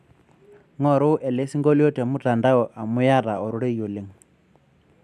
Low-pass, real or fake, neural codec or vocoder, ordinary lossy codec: 14.4 kHz; real; none; none